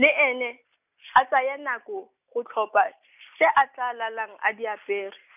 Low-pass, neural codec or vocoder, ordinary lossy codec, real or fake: 3.6 kHz; none; none; real